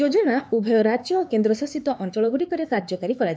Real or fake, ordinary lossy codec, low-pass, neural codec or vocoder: fake; none; none; codec, 16 kHz, 4 kbps, X-Codec, HuBERT features, trained on LibriSpeech